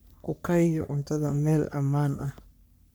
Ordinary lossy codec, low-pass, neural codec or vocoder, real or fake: none; none; codec, 44.1 kHz, 3.4 kbps, Pupu-Codec; fake